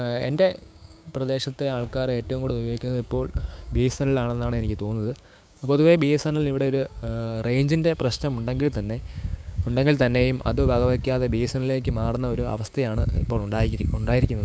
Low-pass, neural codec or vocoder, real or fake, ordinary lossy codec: none; codec, 16 kHz, 6 kbps, DAC; fake; none